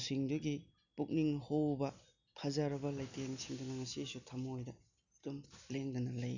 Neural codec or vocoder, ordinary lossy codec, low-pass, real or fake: none; none; 7.2 kHz; real